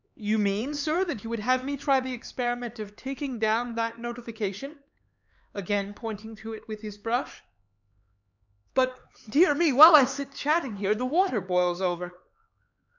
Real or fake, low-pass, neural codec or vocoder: fake; 7.2 kHz; codec, 16 kHz, 4 kbps, X-Codec, HuBERT features, trained on LibriSpeech